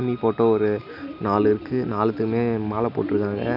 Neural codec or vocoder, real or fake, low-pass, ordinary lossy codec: none; real; 5.4 kHz; none